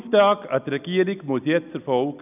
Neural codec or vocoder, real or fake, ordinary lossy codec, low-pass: none; real; none; 3.6 kHz